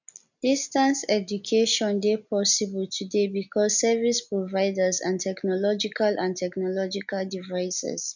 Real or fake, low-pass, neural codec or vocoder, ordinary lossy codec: real; 7.2 kHz; none; none